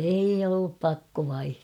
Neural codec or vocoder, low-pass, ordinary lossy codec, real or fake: none; 19.8 kHz; none; real